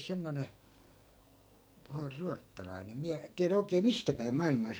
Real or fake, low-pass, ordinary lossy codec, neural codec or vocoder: fake; none; none; codec, 44.1 kHz, 2.6 kbps, SNAC